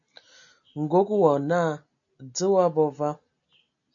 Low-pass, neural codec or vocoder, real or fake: 7.2 kHz; none; real